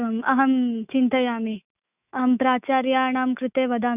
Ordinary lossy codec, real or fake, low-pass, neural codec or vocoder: none; fake; 3.6 kHz; autoencoder, 48 kHz, 128 numbers a frame, DAC-VAE, trained on Japanese speech